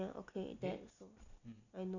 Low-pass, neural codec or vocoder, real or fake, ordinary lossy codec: 7.2 kHz; none; real; none